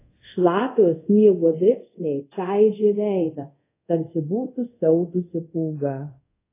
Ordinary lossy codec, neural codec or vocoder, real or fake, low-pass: AAC, 24 kbps; codec, 24 kHz, 0.5 kbps, DualCodec; fake; 3.6 kHz